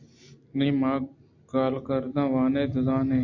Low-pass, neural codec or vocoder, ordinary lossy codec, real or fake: 7.2 kHz; none; AAC, 48 kbps; real